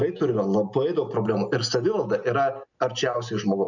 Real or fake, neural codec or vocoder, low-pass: real; none; 7.2 kHz